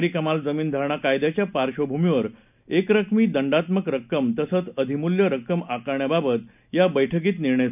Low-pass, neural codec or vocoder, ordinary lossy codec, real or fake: 3.6 kHz; none; none; real